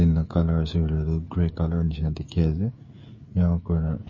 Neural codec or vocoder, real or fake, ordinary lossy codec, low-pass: codec, 16 kHz, 16 kbps, FreqCodec, smaller model; fake; MP3, 32 kbps; 7.2 kHz